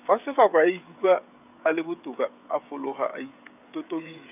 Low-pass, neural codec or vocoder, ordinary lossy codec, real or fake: 3.6 kHz; codec, 16 kHz, 16 kbps, FreqCodec, smaller model; none; fake